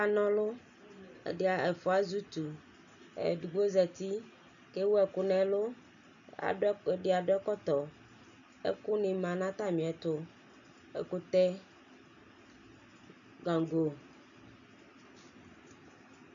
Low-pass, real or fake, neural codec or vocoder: 7.2 kHz; real; none